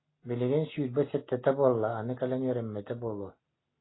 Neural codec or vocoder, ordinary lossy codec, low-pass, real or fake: none; AAC, 16 kbps; 7.2 kHz; real